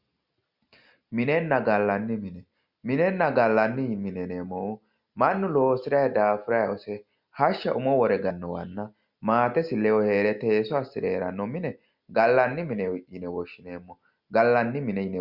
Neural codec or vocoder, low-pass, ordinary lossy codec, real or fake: none; 5.4 kHz; Opus, 64 kbps; real